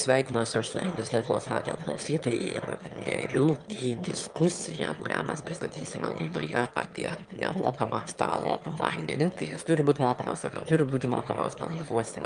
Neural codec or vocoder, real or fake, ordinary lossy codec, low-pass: autoencoder, 22.05 kHz, a latent of 192 numbers a frame, VITS, trained on one speaker; fake; Opus, 32 kbps; 9.9 kHz